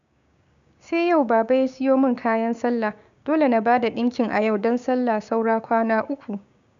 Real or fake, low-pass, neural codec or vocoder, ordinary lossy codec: fake; 7.2 kHz; codec, 16 kHz, 6 kbps, DAC; none